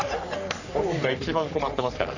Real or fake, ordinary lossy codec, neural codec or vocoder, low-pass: fake; none; codec, 44.1 kHz, 3.4 kbps, Pupu-Codec; 7.2 kHz